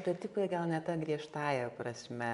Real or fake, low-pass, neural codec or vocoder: fake; 10.8 kHz; vocoder, 24 kHz, 100 mel bands, Vocos